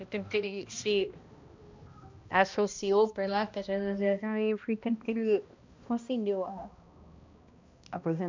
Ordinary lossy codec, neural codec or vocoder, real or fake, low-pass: MP3, 64 kbps; codec, 16 kHz, 1 kbps, X-Codec, HuBERT features, trained on balanced general audio; fake; 7.2 kHz